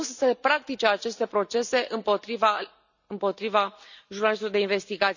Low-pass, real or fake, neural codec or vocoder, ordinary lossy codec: 7.2 kHz; real; none; none